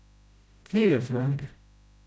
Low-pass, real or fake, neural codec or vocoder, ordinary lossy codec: none; fake; codec, 16 kHz, 0.5 kbps, FreqCodec, smaller model; none